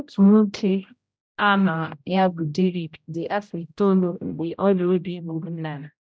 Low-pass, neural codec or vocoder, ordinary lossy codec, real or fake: none; codec, 16 kHz, 0.5 kbps, X-Codec, HuBERT features, trained on general audio; none; fake